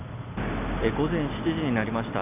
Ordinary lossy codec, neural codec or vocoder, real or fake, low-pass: none; none; real; 3.6 kHz